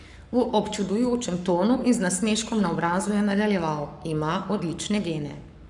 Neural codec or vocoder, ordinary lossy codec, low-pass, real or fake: codec, 44.1 kHz, 7.8 kbps, Pupu-Codec; none; 10.8 kHz; fake